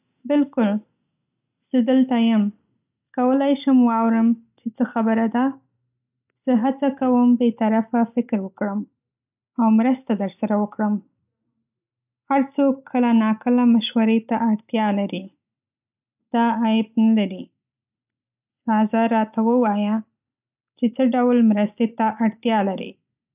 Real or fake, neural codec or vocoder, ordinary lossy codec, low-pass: real; none; none; 3.6 kHz